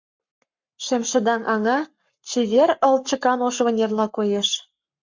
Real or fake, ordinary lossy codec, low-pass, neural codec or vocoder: fake; MP3, 64 kbps; 7.2 kHz; codec, 44.1 kHz, 7.8 kbps, Pupu-Codec